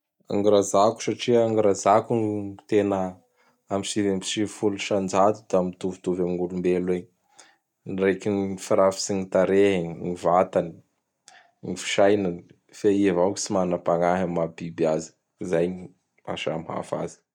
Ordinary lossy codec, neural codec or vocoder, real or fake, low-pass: none; none; real; 19.8 kHz